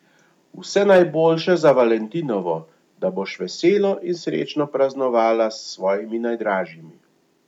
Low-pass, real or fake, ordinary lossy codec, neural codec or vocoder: 19.8 kHz; real; none; none